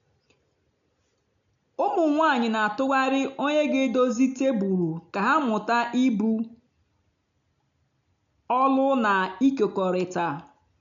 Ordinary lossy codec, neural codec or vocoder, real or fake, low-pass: MP3, 96 kbps; none; real; 7.2 kHz